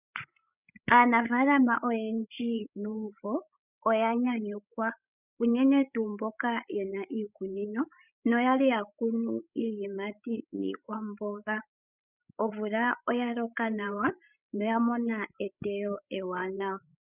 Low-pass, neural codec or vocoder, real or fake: 3.6 kHz; codec, 16 kHz, 16 kbps, FreqCodec, larger model; fake